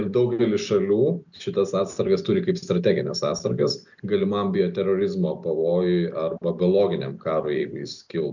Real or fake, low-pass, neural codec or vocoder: real; 7.2 kHz; none